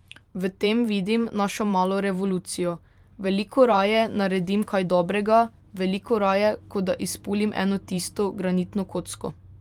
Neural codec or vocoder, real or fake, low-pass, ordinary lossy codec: vocoder, 44.1 kHz, 128 mel bands every 512 samples, BigVGAN v2; fake; 19.8 kHz; Opus, 32 kbps